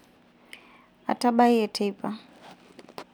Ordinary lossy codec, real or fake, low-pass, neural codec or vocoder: none; real; 19.8 kHz; none